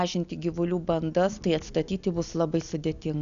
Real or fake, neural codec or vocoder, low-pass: real; none; 7.2 kHz